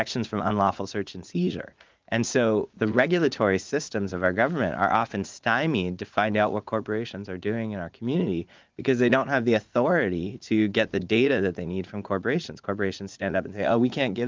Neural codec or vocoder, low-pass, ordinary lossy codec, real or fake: vocoder, 44.1 kHz, 80 mel bands, Vocos; 7.2 kHz; Opus, 24 kbps; fake